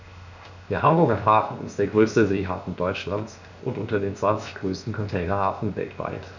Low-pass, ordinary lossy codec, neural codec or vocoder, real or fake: 7.2 kHz; none; codec, 16 kHz, 0.7 kbps, FocalCodec; fake